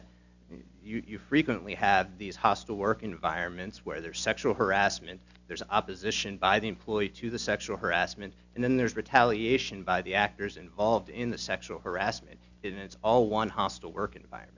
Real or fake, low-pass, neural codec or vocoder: real; 7.2 kHz; none